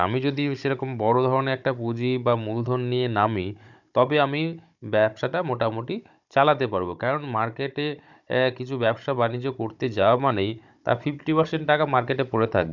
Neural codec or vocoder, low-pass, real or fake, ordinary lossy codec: codec, 16 kHz, 16 kbps, FunCodec, trained on Chinese and English, 50 frames a second; 7.2 kHz; fake; none